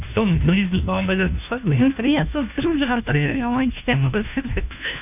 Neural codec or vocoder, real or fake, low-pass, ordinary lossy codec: codec, 16 kHz, 1 kbps, FunCodec, trained on LibriTTS, 50 frames a second; fake; 3.6 kHz; none